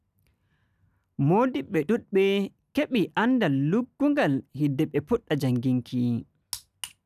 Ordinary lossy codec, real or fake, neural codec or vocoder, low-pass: none; real; none; 14.4 kHz